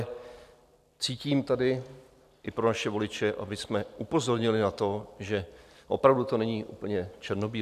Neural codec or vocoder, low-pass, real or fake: none; 14.4 kHz; real